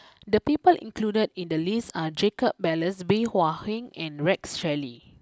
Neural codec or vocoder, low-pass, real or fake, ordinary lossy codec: none; none; real; none